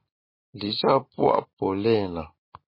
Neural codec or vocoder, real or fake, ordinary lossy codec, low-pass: none; real; MP3, 24 kbps; 5.4 kHz